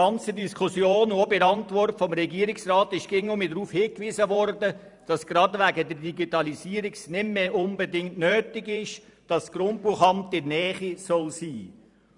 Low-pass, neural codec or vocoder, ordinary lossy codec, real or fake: 10.8 kHz; vocoder, 44.1 kHz, 128 mel bands every 512 samples, BigVGAN v2; none; fake